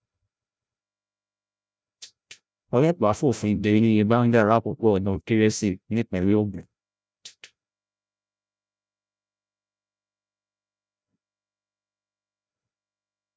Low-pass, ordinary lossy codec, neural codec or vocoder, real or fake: none; none; codec, 16 kHz, 0.5 kbps, FreqCodec, larger model; fake